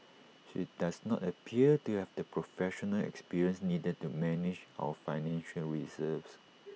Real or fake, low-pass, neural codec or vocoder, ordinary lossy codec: real; none; none; none